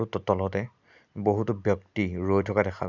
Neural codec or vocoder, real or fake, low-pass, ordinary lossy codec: none; real; 7.2 kHz; none